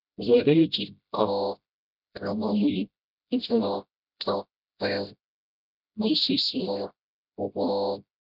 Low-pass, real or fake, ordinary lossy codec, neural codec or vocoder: 5.4 kHz; fake; none; codec, 16 kHz, 0.5 kbps, FreqCodec, smaller model